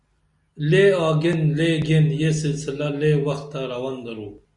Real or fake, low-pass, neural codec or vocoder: real; 10.8 kHz; none